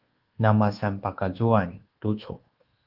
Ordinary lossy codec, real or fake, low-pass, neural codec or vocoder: Opus, 16 kbps; fake; 5.4 kHz; codec, 24 kHz, 1.2 kbps, DualCodec